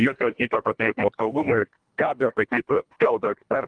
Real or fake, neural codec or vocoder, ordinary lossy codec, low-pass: fake; codec, 24 kHz, 1.5 kbps, HILCodec; Opus, 32 kbps; 9.9 kHz